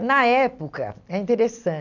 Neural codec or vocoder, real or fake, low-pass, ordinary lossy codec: none; real; 7.2 kHz; none